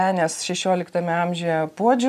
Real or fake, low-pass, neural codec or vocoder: real; 14.4 kHz; none